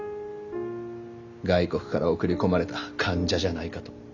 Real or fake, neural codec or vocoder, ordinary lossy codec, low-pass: real; none; none; 7.2 kHz